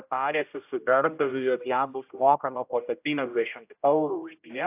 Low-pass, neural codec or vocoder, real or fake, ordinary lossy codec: 3.6 kHz; codec, 16 kHz, 0.5 kbps, X-Codec, HuBERT features, trained on general audio; fake; AAC, 32 kbps